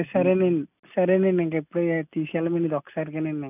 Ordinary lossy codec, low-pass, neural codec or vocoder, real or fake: none; 3.6 kHz; none; real